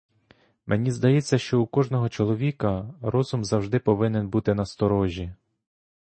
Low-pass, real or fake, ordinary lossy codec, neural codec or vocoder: 9.9 kHz; real; MP3, 32 kbps; none